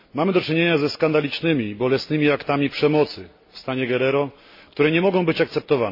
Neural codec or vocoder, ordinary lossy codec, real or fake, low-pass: none; MP3, 24 kbps; real; 5.4 kHz